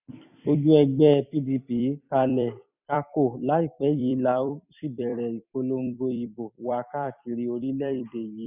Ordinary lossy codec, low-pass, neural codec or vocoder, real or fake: none; 3.6 kHz; vocoder, 44.1 kHz, 128 mel bands every 256 samples, BigVGAN v2; fake